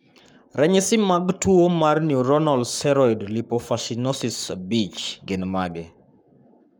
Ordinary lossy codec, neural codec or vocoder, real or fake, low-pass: none; codec, 44.1 kHz, 7.8 kbps, Pupu-Codec; fake; none